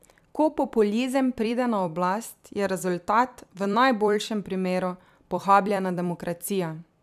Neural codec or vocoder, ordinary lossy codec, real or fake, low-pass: vocoder, 44.1 kHz, 128 mel bands every 256 samples, BigVGAN v2; none; fake; 14.4 kHz